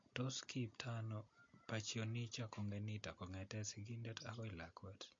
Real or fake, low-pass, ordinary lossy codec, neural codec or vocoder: real; 7.2 kHz; MP3, 48 kbps; none